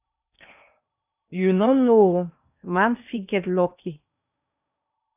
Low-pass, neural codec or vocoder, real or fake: 3.6 kHz; codec, 16 kHz in and 24 kHz out, 0.8 kbps, FocalCodec, streaming, 65536 codes; fake